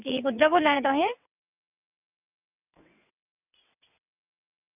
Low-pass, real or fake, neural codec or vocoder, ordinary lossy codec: 3.6 kHz; fake; vocoder, 22.05 kHz, 80 mel bands, WaveNeXt; none